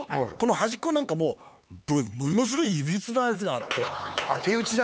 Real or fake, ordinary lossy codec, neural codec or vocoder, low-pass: fake; none; codec, 16 kHz, 4 kbps, X-Codec, HuBERT features, trained on LibriSpeech; none